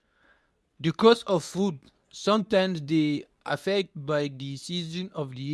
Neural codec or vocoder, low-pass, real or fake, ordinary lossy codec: codec, 24 kHz, 0.9 kbps, WavTokenizer, medium speech release version 2; none; fake; none